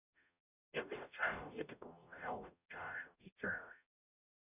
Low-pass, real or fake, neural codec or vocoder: 3.6 kHz; fake; codec, 44.1 kHz, 0.9 kbps, DAC